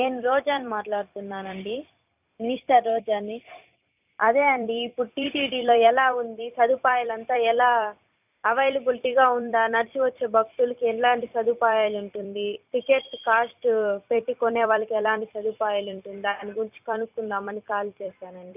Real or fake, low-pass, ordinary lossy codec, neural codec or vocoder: fake; 3.6 kHz; none; vocoder, 44.1 kHz, 128 mel bands every 256 samples, BigVGAN v2